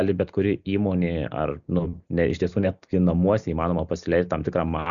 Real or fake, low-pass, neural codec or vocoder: real; 7.2 kHz; none